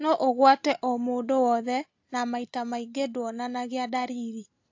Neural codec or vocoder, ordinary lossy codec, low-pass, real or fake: none; AAC, 48 kbps; 7.2 kHz; real